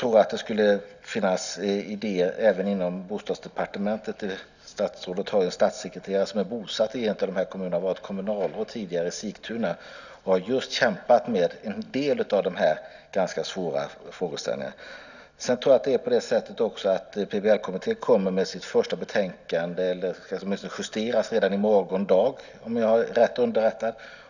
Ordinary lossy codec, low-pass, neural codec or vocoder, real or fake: none; 7.2 kHz; none; real